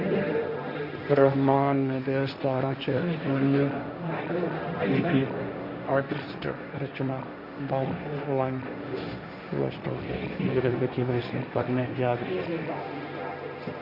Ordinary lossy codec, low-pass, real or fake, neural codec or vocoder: Opus, 64 kbps; 5.4 kHz; fake; codec, 16 kHz, 1.1 kbps, Voila-Tokenizer